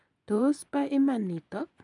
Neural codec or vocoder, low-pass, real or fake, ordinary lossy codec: vocoder, 24 kHz, 100 mel bands, Vocos; 10.8 kHz; fake; none